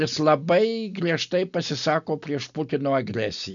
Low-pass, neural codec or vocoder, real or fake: 7.2 kHz; none; real